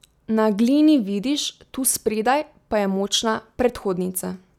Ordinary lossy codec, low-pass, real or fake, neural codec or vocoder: none; 19.8 kHz; real; none